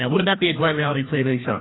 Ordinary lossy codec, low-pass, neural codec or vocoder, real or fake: AAC, 16 kbps; 7.2 kHz; codec, 16 kHz, 1 kbps, FreqCodec, larger model; fake